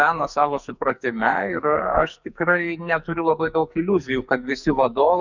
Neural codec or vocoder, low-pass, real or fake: codec, 44.1 kHz, 2.6 kbps, SNAC; 7.2 kHz; fake